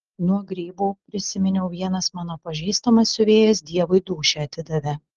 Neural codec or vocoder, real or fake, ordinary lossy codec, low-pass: none; real; Opus, 16 kbps; 7.2 kHz